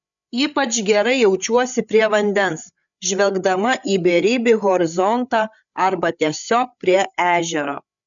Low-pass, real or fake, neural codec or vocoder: 7.2 kHz; fake; codec, 16 kHz, 8 kbps, FreqCodec, larger model